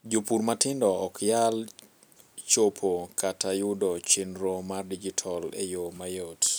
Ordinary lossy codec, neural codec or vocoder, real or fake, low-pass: none; none; real; none